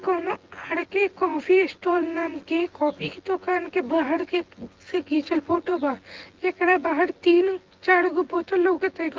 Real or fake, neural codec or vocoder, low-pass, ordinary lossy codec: fake; vocoder, 24 kHz, 100 mel bands, Vocos; 7.2 kHz; Opus, 16 kbps